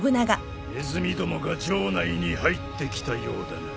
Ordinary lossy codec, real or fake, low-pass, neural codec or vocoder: none; real; none; none